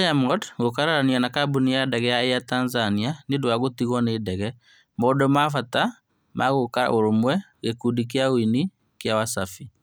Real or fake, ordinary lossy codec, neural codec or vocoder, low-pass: real; none; none; none